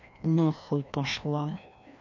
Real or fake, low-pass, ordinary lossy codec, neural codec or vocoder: fake; 7.2 kHz; none; codec, 16 kHz, 1 kbps, FreqCodec, larger model